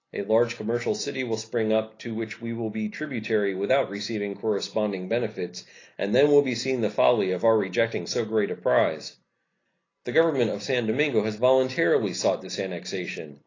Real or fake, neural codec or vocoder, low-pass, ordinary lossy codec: real; none; 7.2 kHz; AAC, 32 kbps